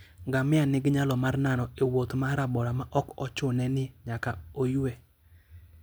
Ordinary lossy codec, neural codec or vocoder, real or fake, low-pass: none; none; real; none